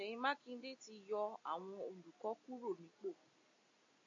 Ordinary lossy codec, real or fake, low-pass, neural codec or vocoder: AAC, 48 kbps; real; 7.2 kHz; none